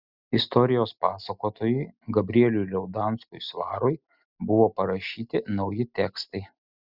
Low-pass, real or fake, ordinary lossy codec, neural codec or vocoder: 5.4 kHz; real; Opus, 64 kbps; none